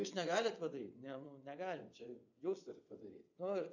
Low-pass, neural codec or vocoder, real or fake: 7.2 kHz; vocoder, 22.05 kHz, 80 mel bands, WaveNeXt; fake